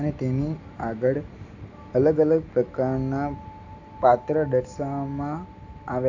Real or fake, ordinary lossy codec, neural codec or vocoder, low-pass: real; AAC, 48 kbps; none; 7.2 kHz